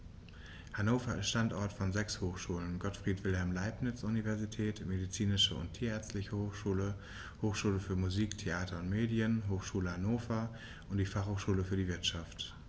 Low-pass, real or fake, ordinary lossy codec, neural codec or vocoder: none; real; none; none